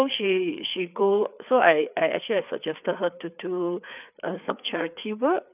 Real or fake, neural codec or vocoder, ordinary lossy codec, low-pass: fake; codec, 16 kHz, 4 kbps, FreqCodec, larger model; none; 3.6 kHz